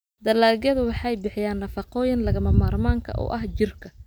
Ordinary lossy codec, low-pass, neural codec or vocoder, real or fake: none; none; none; real